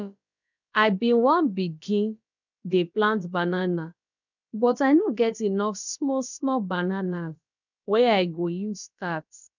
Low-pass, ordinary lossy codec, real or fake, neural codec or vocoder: 7.2 kHz; none; fake; codec, 16 kHz, about 1 kbps, DyCAST, with the encoder's durations